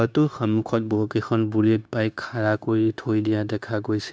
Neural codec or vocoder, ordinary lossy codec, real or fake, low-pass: codec, 16 kHz, 0.9 kbps, LongCat-Audio-Codec; none; fake; none